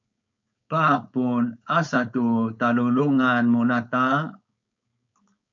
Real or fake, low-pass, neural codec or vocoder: fake; 7.2 kHz; codec, 16 kHz, 4.8 kbps, FACodec